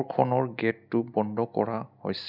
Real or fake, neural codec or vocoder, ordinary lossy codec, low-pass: real; none; none; 5.4 kHz